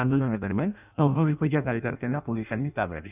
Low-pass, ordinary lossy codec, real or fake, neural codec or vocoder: 3.6 kHz; none; fake; codec, 16 kHz in and 24 kHz out, 0.6 kbps, FireRedTTS-2 codec